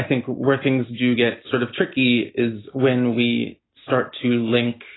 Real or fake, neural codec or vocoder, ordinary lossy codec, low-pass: real; none; AAC, 16 kbps; 7.2 kHz